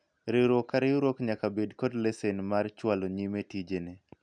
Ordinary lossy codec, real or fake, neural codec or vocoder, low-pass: none; real; none; 9.9 kHz